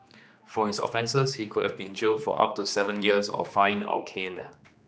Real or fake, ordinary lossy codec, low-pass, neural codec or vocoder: fake; none; none; codec, 16 kHz, 2 kbps, X-Codec, HuBERT features, trained on general audio